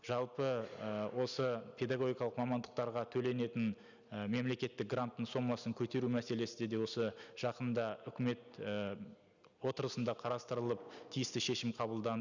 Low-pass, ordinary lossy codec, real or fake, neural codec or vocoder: 7.2 kHz; none; real; none